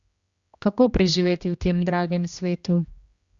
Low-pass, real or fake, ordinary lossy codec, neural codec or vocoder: 7.2 kHz; fake; Opus, 64 kbps; codec, 16 kHz, 1 kbps, X-Codec, HuBERT features, trained on general audio